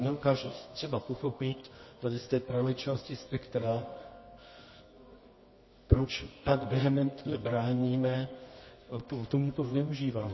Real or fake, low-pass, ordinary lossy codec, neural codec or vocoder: fake; 7.2 kHz; MP3, 24 kbps; codec, 24 kHz, 0.9 kbps, WavTokenizer, medium music audio release